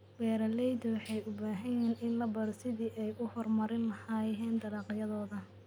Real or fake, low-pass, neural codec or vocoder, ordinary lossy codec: real; 19.8 kHz; none; none